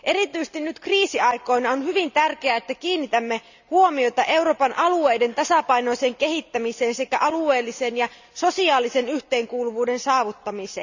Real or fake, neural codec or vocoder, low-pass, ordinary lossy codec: real; none; 7.2 kHz; none